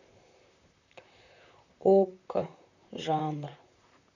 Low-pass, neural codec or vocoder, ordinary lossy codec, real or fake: 7.2 kHz; vocoder, 44.1 kHz, 128 mel bands, Pupu-Vocoder; none; fake